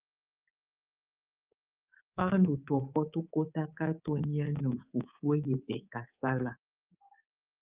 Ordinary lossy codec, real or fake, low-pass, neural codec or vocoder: Opus, 24 kbps; fake; 3.6 kHz; codec, 24 kHz, 3.1 kbps, DualCodec